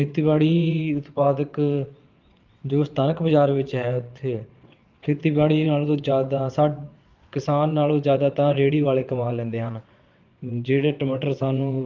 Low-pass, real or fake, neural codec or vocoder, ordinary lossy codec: 7.2 kHz; fake; vocoder, 22.05 kHz, 80 mel bands, WaveNeXt; Opus, 32 kbps